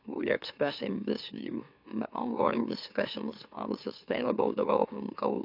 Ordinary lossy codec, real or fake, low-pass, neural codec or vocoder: AAC, 48 kbps; fake; 5.4 kHz; autoencoder, 44.1 kHz, a latent of 192 numbers a frame, MeloTTS